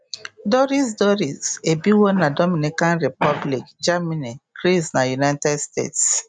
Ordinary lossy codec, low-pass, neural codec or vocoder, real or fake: none; 9.9 kHz; none; real